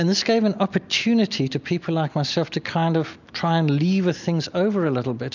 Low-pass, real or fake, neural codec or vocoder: 7.2 kHz; real; none